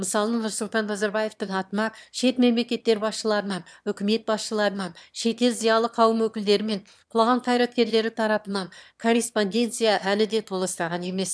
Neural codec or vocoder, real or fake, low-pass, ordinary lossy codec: autoencoder, 22.05 kHz, a latent of 192 numbers a frame, VITS, trained on one speaker; fake; none; none